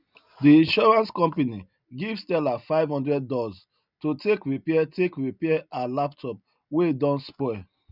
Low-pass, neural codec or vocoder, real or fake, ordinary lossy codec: 5.4 kHz; none; real; none